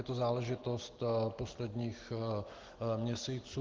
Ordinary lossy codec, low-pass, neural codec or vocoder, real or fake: Opus, 16 kbps; 7.2 kHz; none; real